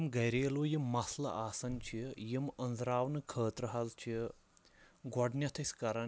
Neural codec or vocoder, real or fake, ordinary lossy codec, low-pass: none; real; none; none